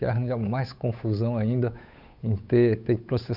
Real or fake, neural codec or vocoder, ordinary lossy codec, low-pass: fake; codec, 16 kHz, 8 kbps, FunCodec, trained on Chinese and English, 25 frames a second; none; 5.4 kHz